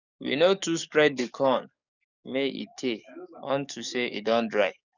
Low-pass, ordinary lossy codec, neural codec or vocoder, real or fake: 7.2 kHz; none; codec, 44.1 kHz, 7.8 kbps, DAC; fake